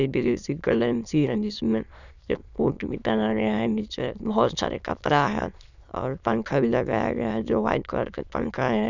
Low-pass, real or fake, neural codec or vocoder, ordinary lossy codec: 7.2 kHz; fake; autoencoder, 22.05 kHz, a latent of 192 numbers a frame, VITS, trained on many speakers; none